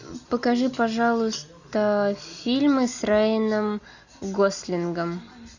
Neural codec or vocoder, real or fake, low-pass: none; real; 7.2 kHz